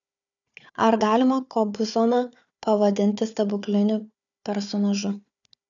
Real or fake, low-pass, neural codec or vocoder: fake; 7.2 kHz; codec, 16 kHz, 4 kbps, FunCodec, trained on Chinese and English, 50 frames a second